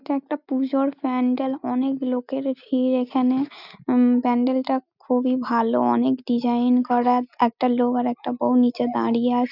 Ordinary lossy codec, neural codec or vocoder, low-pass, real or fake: none; none; 5.4 kHz; real